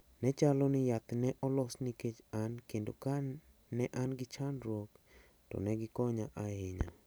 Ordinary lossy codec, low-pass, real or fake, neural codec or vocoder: none; none; real; none